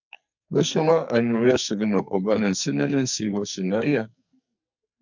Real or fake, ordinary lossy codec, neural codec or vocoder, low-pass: fake; MP3, 64 kbps; codec, 44.1 kHz, 2.6 kbps, SNAC; 7.2 kHz